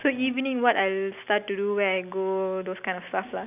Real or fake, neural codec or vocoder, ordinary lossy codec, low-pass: real; none; none; 3.6 kHz